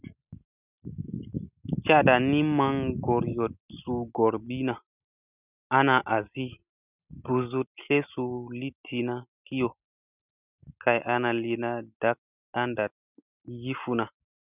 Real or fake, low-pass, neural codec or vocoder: real; 3.6 kHz; none